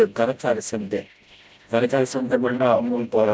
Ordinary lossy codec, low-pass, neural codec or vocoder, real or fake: none; none; codec, 16 kHz, 0.5 kbps, FreqCodec, smaller model; fake